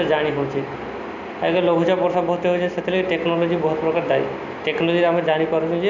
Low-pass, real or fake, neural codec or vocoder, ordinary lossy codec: 7.2 kHz; real; none; none